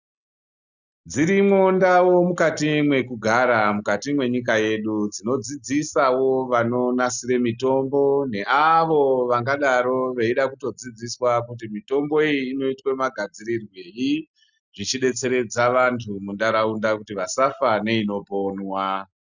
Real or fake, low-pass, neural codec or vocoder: real; 7.2 kHz; none